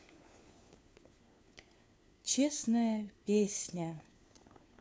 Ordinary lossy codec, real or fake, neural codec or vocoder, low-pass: none; fake; codec, 16 kHz, 4 kbps, FunCodec, trained on LibriTTS, 50 frames a second; none